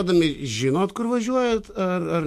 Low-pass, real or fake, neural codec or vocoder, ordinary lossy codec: 14.4 kHz; fake; autoencoder, 48 kHz, 128 numbers a frame, DAC-VAE, trained on Japanese speech; MP3, 64 kbps